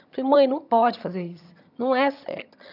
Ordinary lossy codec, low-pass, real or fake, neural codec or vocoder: none; 5.4 kHz; fake; vocoder, 22.05 kHz, 80 mel bands, HiFi-GAN